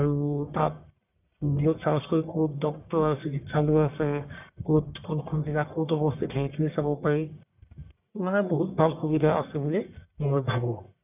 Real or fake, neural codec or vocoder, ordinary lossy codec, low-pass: fake; codec, 44.1 kHz, 1.7 kbps, Pupu-Codec; none; 3.6 kHz